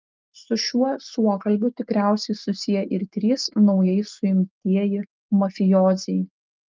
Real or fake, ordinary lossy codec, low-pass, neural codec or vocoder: real; Opus, 32 kbps; 7.2 kHz; none